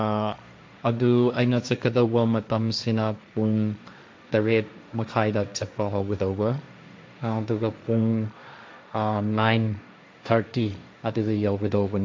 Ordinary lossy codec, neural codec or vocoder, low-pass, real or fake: none; codec, 16 kHz, 1.1 kbps, Voila-Tokenizer; none; fake